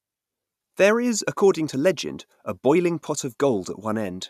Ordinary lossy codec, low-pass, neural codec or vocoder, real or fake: none; 14.4 kHz; none; real